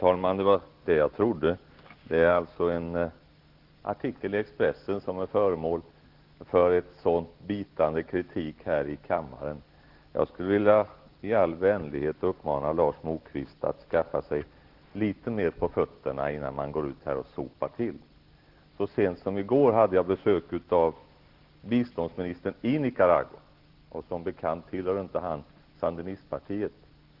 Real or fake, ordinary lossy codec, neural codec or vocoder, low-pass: real; Opus, 16 kbps; none; 5.4 kHz